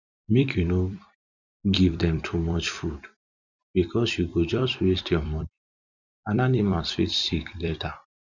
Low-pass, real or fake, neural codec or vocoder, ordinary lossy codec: 7.2 kHz; fake; vocoder, 44.1 kHz, 128 mel bands every 256 samples, BigVGAN v2; none